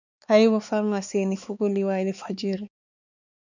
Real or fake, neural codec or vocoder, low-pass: fake; codec, 16 kHz, 4 kbps, X-Codec, HuBERT features, trained on balanced general audio; 7.2 kHz